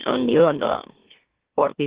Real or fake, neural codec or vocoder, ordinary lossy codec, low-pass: fake; autoencoder, 44.1 kHz, a latent of 192 numbers a frame, MeloTTS; Opus, 16 kbps; 3.6 kHz